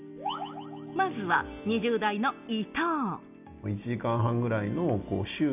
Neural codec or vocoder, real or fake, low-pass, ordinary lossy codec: none; real; 3.6 kHz; none